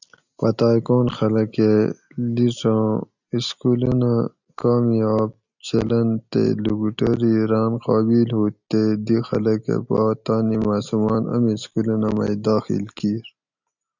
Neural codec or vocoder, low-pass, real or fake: none; 7.2 kHz; real